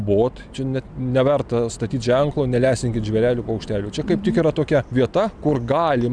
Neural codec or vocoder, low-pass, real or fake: none; 9.9 kHz; real